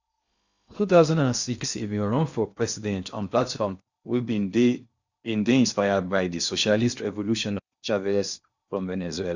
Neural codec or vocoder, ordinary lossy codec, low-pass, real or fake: codec, 16 kHz in and 24 kHz out, 0.8 kbps, FocalCodec, streaming, 65536 codes; Opus, 64 kbps; 7.2 kHz; fake